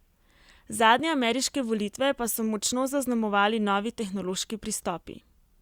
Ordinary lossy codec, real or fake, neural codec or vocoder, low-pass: Opus, 64 kbps; real; none; 19.8 kHz